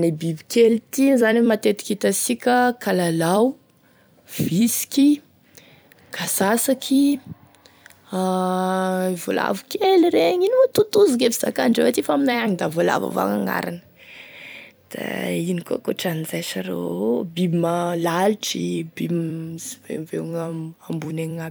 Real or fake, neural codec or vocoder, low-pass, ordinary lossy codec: fake; vocoder, 44.1 kHz, 128 mel bands every 256 samples, BigVGAN v2; none; none